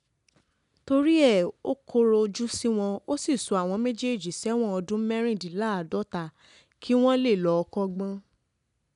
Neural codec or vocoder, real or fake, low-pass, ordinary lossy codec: none; real; 10.8 kHz; none